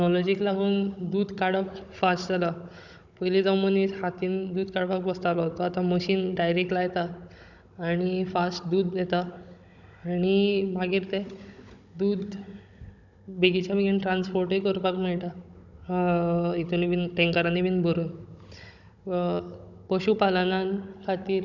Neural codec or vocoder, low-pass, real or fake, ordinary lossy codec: codec, 16 kHz, 16 kbps, FunCodec, trained on Chinese and English, 50 frames a second; 7.2 kHz; fake; none